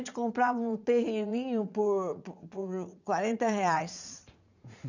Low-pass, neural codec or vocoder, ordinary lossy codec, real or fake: 7.2 kHz; vocoder, 44.1 kHz, 80 mel bands, Vocos; none; fake